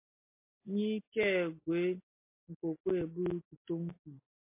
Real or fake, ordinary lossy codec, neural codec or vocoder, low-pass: real; MP3, 24 kbps; none; 3.6 kHz